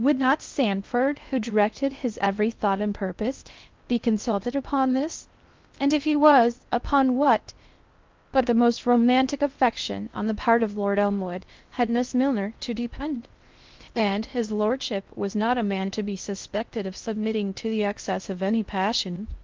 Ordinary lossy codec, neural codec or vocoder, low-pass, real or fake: Opus, 32 kbps; codec, 16 kHz in and 24 kHz out, 0.6 kbps, FocalCodec, streaming, 2048 codes; 7.2 kHz; fake